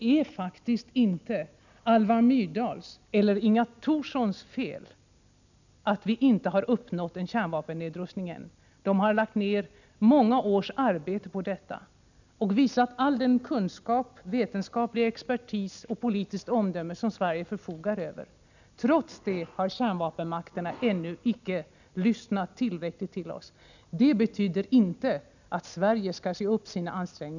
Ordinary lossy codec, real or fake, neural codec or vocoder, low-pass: none; real; none; 7.2 kHz